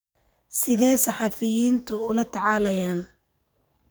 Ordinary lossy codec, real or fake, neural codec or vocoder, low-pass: none; fake; codec, 44.1 kHz, 2.6 kbps, SNAC; none